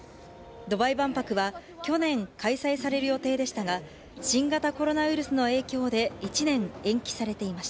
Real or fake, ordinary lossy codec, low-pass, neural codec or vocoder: real; none; none; none